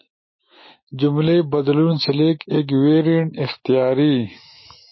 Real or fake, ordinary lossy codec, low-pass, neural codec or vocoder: fake; MP3, 24 kbps; 7.2 kHz; autoencoder, 48 kHz, 128 numbers a frame, DAC-VAE, trained on Japanese speech